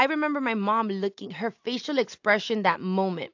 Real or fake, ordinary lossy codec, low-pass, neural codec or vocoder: real; AAC, 48 kbps; 7.2 kHz; none